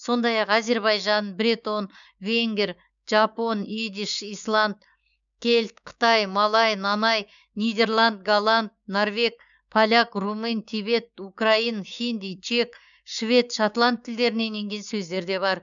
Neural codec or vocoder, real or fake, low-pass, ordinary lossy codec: codec, 16 kHz, 6 kbps, DAC; fake; 7.2 kHz; none